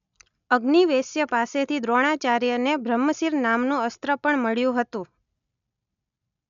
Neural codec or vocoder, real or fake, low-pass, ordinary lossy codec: none; real; 7.2 kHz; none